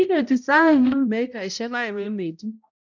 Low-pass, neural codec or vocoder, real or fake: 7.2 kHz; codec, 16 kHz, 0.5 kbps, X-Codec, HuBERT features, trained on balanced general audio; fake